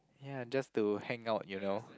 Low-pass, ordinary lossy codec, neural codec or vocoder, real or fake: none; none; none; real